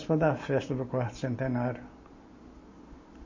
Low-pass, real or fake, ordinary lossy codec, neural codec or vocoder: 7.2 kHz; real; MP3, 32 kbps; none